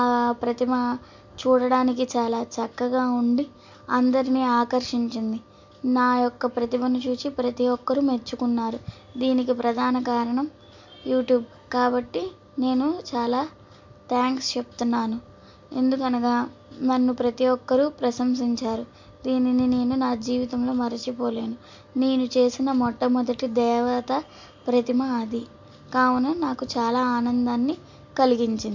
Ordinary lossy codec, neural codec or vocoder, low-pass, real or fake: MP3, 48 kbps; none; 7.2 kHz; real